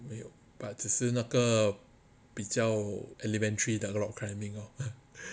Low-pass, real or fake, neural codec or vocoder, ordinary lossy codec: none; real; none; none